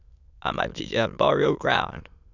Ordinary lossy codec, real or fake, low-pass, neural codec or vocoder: none; fake; 7.2 kHz; autoencoder, 22.05 kHz, a latent of 192 numbers a frame, VITS, trained on many speakers